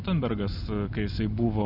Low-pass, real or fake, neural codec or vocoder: 5.4 kHz; real; none